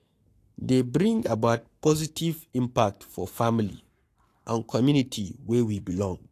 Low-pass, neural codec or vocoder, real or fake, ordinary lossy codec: 14.4 kHz; codec, 44.1 kHz, 7.8 kbps, Pupu-Codec; fake; AAC, 64 kbps